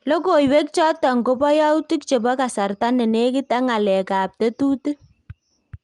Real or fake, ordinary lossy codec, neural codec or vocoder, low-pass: real; Opus, 32 kbps; none; 10.8 kHz